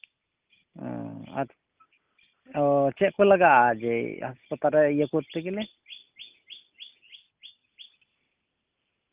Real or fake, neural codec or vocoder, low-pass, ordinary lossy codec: real; none; 3.6 kHz; Opus, 24 kbps